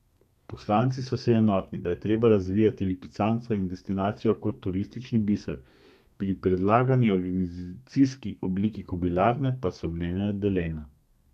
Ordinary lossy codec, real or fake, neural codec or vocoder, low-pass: none; fake; codec, 32 kHz, 1.9 kbps, SNAC; 14.4 kHz